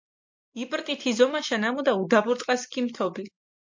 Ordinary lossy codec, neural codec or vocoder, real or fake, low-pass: MP3, 48 kbps; none; real; 7.2 kHz